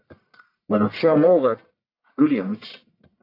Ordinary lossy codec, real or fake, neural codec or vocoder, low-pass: AAC, 32 kbps; fake; codec, 44.1 kHz, 1.7 kbps, Pupu-Codec; 5.4 kHz